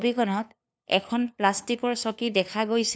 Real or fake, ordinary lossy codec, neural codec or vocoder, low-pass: fake; none; codec, 16 kHz, 2 kbps, FunCodec, trained on LibriTTS, 25 frames a second; none